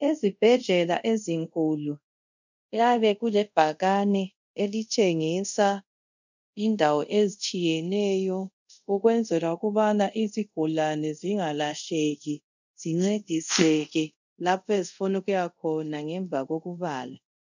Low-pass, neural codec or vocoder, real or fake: 7.2 kHz; codec, 24 kHz, 0.5 kbps, DualCodec; fake